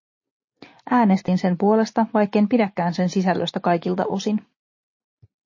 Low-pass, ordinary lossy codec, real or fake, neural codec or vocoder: 7.2 kHz; MP3, 32 kbps; real; none